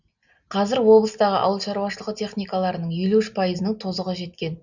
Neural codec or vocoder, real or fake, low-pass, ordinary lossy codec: none; real; 7.2 kHz; none